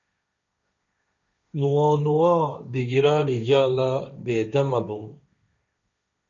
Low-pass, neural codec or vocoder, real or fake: 7.2 kHz; codec, 16 kHz, 1.1 kbps, Voila-Tokenizer; fake